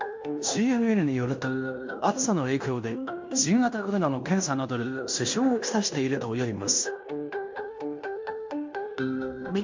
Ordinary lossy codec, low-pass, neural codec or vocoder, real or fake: MP3, 48 kbps; 7.2 kHz; codec, 16 kHz in and 24 kHz out, 0.9 kbps, LongCat-Audio-Codec, fine tuned four codebook decoder; fake